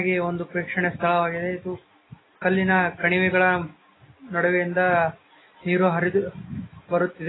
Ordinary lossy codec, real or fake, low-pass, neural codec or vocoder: AAC, 16 kbps; real; 7.2 kHz; none